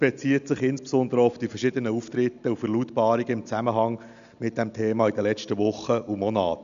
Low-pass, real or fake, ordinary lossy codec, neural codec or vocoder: 7.2 kHz; real; none; none